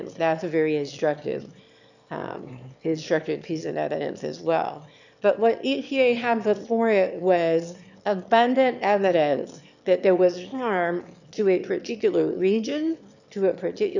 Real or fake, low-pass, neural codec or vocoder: fake; 7.2 kHz; autoencoder, 22.05 kHz, a latent of 192 numbers a frame, VITS, trained on one speaker